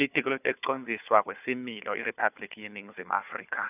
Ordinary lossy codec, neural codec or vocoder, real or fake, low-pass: none; codec, 16 kHz, 4 kbps, FunCodec, trained on Chinese and English, 50 frames a second; fake; 3.6 kHz